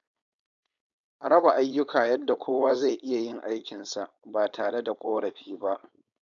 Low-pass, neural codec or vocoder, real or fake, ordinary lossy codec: 7.2 kHz; codec, 16 kHz, 4.8 kbps, FACodec; fake; none